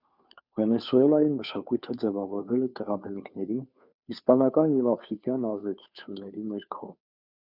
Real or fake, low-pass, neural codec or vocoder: fake; 5.4 kHz; codec, 16 kHz, 2 kbps, FunCodec, trained on Chinese and English, 25 frames a second